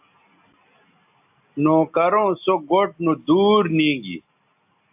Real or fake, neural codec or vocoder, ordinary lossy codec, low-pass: real; none; AAC, 32 kbps; 3.6 kHz